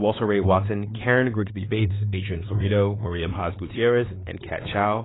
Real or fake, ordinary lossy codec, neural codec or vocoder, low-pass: fake; AAC, 16 kbps; codec, 16 kHz, 4 kbps, X-Codec, WavLM features, trained on Multilingual LibriSpeech; 7.2 kHz